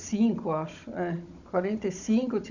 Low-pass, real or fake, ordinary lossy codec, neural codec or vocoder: 7.2 kHz; fake; none; codec, 16 kHz, 16 kbps, FunCodec, trained on Chinese and English, 50 frames a second